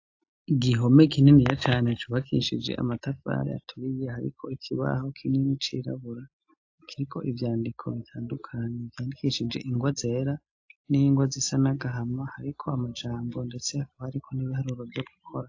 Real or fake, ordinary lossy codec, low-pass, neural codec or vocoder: real; AAC, 48 kbps; 7.2 kHz; none